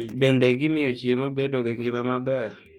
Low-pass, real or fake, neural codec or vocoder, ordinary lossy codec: 19.8 kHz; fake; codec, 44.1 kHz, 2.6 kbps, DAC; MP3, 96 kbps